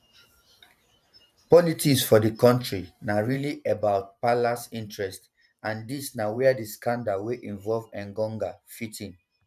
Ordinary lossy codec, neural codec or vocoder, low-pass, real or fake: none; vocoder, 44.1 kHz, 128 mel bands every 512 samples, BigVGAN v2; 14.4 kHz; fake